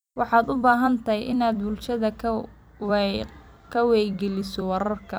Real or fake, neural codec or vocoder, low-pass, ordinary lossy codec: fake; vocoder, 44.1 kHz, 128 mel bands every 256 samples, BigVGAN v2; none; none